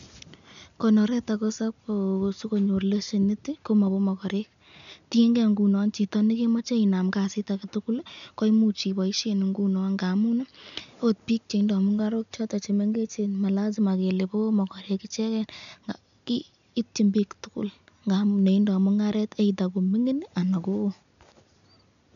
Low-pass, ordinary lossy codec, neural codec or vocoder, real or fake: 7.2 kHz; none; none; real